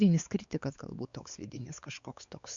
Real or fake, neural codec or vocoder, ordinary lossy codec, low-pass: fake; codec, 16 kHz, 4 kbps, X-Codec, WavLM features, trained on Multilingual LibriSpeech; Opus, 64 kbps; 7.2 kHz